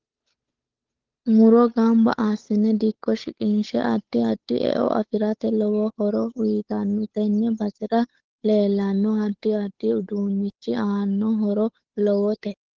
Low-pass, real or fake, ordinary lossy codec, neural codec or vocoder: 7.2 kHz; fake; Opus, 16 kbps; codec, 16 kHz, 8 kbps, FunCodec, trained on Chinese and English, 25 frames a second